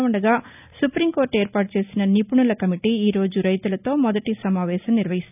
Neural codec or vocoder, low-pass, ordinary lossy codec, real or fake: none; 3.6 kHz; none; real